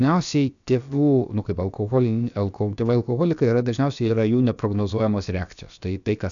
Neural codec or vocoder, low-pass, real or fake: codec, 16 kHz, about 1 kbps, DyCAST, with the encoder's durations; 7.2 kHz; fake